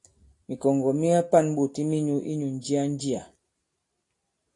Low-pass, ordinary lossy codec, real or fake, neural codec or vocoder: 10.8 kHz; AAC, 48 kbps; real; none